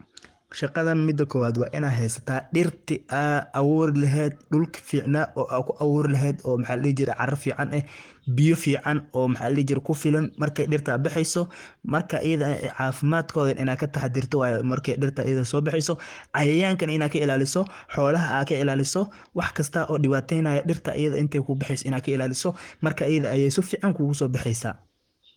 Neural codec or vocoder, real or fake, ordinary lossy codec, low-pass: codec, 44.1 kHz, 7.8 kbps, Pupu-Codec; fake; Opus, 24 kbps; 19.8 kHz